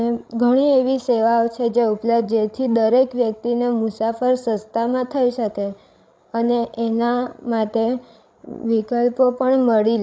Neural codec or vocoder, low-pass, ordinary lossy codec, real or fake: codec, 16 kHz, 16 kbps, FreqCodec, larger model; none; none; fake